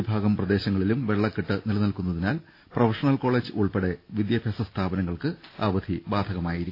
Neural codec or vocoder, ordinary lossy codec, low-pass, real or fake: none; AAC, 32 kbps; 5.4 kHz; real